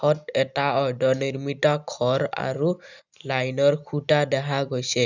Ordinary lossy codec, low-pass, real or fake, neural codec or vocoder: none; 7.2 kHz; real; none